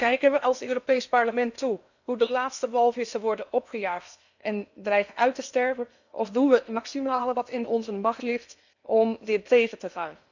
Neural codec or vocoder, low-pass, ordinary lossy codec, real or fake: codec, 16 kHz in and 24 kHz out, 0.8 kbps, FocalCodec, streaming, 65536 codes; 7.2 kHz; none; fake